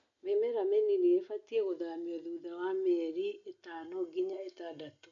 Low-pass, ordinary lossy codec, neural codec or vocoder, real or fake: 7.2 kHz; none; none; real